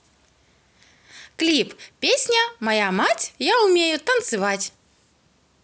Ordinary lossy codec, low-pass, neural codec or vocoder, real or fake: none; none; none; real